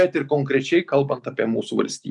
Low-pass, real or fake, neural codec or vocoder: 10.8 kHz; real; none